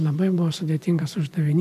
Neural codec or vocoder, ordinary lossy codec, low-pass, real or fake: vocoder, 44.1 kHz, 128 mel bands every 512 samples, BigVGAN v2; AAC, 96 kbps; 14.4 kHz; fake